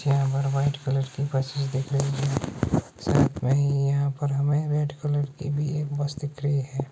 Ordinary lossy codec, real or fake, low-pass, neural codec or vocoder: none; real; none; none